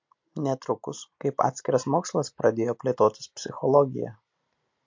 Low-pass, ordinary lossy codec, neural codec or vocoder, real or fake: 7.2 kHz; MP3, 48 kbps; none; real